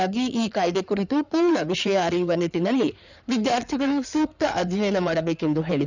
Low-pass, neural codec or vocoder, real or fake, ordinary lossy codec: 7.2 kHz; codec, 16 kHz in and 24 kHz out, 2.2 kbps, FireRedTTS-2 codec; fake; none